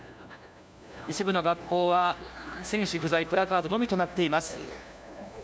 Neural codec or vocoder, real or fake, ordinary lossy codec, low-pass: codec, 16 kHz, 1 kbps, FunCodec, trained on LibriTTS, 50 frames a second; fake; none; none